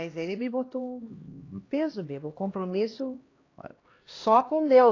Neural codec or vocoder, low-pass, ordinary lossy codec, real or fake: codec, 16 kHz, 1 kbps, X-Codec, HuBERT features, trained on LibriSpeech; 7.2 kHz; AAC, 32 kbps; fake